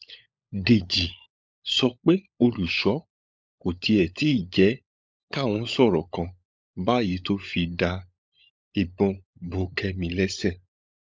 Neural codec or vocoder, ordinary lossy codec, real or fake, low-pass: codec, 16 kHz, 16 kbps, FunCodec, trained on LibriTTS, 50 frames a second; none; fake; none